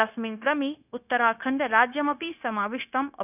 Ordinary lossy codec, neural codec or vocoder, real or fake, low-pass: none; codec, 16 kHz, 0.9 kbps, LongCat-Audio-Codec; fake; 3.6 kHz